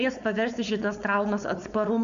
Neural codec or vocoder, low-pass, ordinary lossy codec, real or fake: codec, 16 kHz, 4.8 kbps, FACodec; 7.2 kHz; AAC, 96 kbps; fake